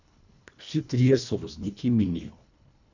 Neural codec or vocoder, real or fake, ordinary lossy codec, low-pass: codec, 24 kHz, 1.5 kbps, HILCodec; fake; none; 7.2 kHz